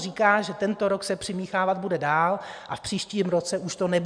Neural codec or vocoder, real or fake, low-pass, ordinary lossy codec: none; real; 9.9 kHz; MP3, 96 kbps